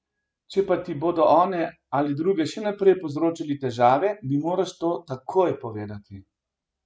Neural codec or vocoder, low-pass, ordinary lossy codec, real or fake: none; none; none; real